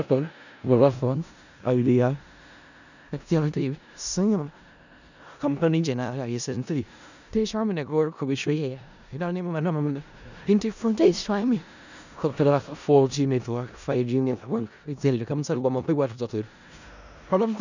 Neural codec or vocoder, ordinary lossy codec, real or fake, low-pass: codec, 16 kHz in and 24 kHz out, 0.4 kbps, LongCat-Audio-Codec, four codebook decoder; none; fake; 7.2 kHz